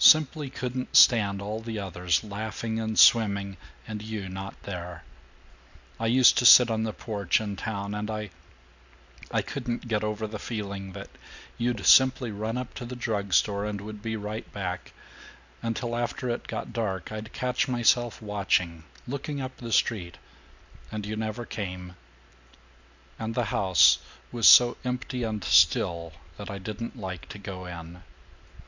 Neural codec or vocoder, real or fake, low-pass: none; real; 7.2 kHz